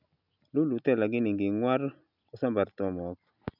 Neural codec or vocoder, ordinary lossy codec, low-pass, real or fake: none; none; 5.4 kHz; real